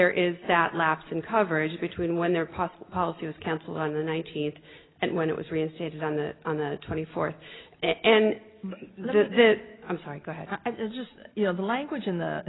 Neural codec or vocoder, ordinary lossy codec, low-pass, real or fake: none; AAC, 16 kbps; 7.2 kHz; real